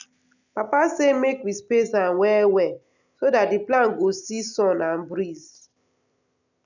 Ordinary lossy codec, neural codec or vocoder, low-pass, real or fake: none; none; 7.2 kHz; real